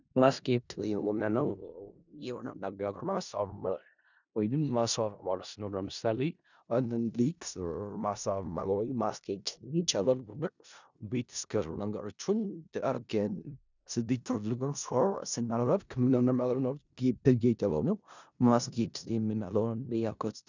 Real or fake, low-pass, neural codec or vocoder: fake; 7.2 kHz; codec, 16 kHz in and 24 kHz out, 0.4 kbps, LongCat-Audio-Codec, four codebook decoder